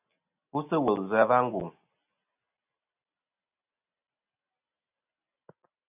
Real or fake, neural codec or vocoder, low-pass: real; none; 3.6 kHz